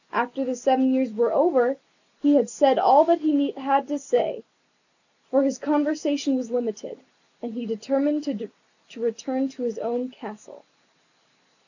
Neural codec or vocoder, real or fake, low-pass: none; real; 7.2 kHz